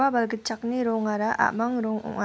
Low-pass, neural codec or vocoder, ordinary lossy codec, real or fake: none; none; none; real